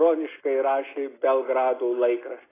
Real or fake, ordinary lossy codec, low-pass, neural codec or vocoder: real; AAC, 16 kbps; 3.6 kHz; none